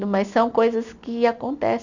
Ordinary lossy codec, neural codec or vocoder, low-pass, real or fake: none; none; 7.2 kHz; real